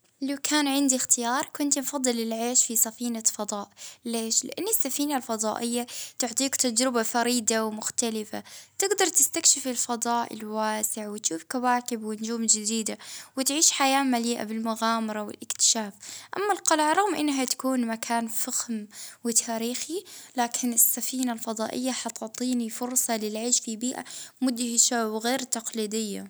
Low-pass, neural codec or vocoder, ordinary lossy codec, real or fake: none; none; none; real